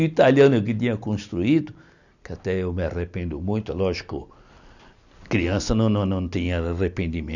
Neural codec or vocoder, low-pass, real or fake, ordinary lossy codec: none; 7.2 kHz; real; none